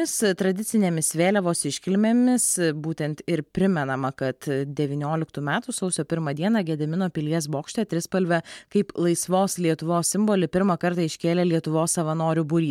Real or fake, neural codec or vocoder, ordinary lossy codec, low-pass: real; none; MP3, 96 kbps; 19.8 kHz